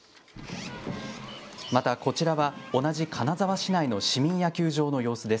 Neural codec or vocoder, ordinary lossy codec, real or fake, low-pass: none; none; real; none